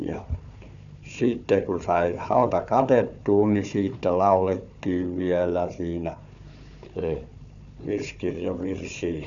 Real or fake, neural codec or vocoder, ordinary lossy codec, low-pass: fake; codec, 16 kHz, 4 kbps, FunCodec, trained on Chinese and English, 50 frames a second; none; 7.2 kHz